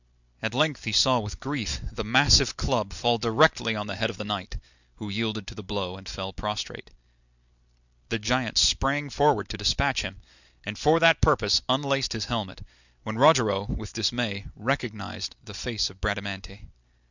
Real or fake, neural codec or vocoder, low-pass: real; none; 7.2 kHz